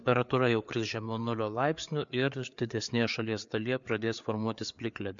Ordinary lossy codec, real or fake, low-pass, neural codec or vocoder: MP3, 64 kbps; fake; 7.2 kHz; codec, 16 kHz, 4 kbps, FreqCodec, larger model